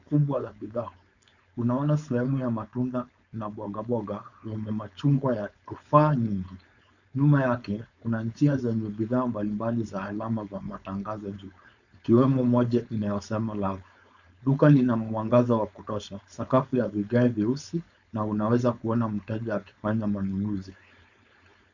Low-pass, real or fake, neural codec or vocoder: 7.2 kHz; fake; codec, 16 kHz, 4.8 kbps, FACodec